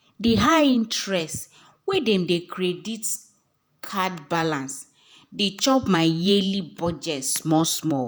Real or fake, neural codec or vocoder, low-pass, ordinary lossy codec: fake; vocoder, 48 kHz, 128 mel bands, Vocos; none; none